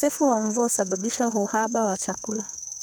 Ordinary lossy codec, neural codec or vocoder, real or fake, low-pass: none; codec, 44.1 kHz, 2.6 kbps, SNAC; fake; none